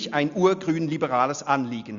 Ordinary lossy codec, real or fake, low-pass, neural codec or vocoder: Opus, 64 kbps; real; 7.2 kHz; none